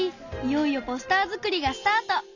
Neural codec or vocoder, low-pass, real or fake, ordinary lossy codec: none; 7.2 kHz; real; none